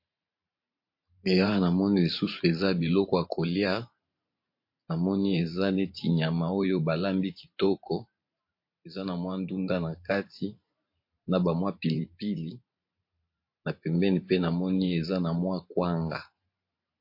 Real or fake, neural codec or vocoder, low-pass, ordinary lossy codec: fake; vocoder, 44.1 kHz, 128 mel bands every 512 samples, BigVGAN v2; 5.4 kHz; MP3, 32 kbps